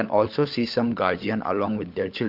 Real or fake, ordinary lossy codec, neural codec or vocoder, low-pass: fake; Opus, 24 kbps; codec, 16 kHz, 16 kbps, FunCodec, trained on LibriTTS, 50 frames a second; 5.4 kHz